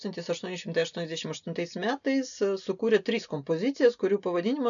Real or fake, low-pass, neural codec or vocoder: real; 7.2 kHz; none